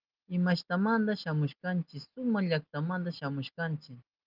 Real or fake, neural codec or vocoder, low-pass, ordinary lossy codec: real; none; 5.4 kHz; Opus, 32 kbps